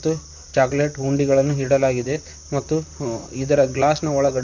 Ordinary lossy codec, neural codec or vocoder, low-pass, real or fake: none; vocoder, 44.1 kHz, 128 mel bands, Pupu-Vocoder; 7.2 kHz; fake